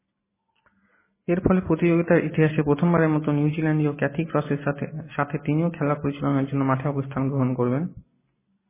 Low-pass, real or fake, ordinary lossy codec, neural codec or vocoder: 3.6 kHz; real; MP3, 16 kbps; none